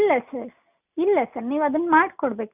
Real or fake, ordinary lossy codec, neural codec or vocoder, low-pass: real; none; none; 3.6 kHz